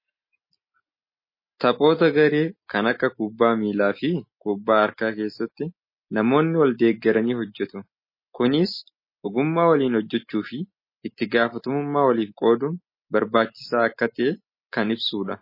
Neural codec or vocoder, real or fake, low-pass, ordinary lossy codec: none; real; 5.4 kHz; MP3, 24 kbps